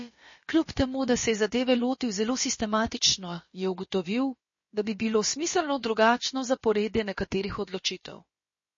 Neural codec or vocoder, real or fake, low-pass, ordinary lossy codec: codec, 16 kHz, about 1 kbps, DyCAST, with the encoder's durations; fake; 7.2 kHz; MP3, 32 kbps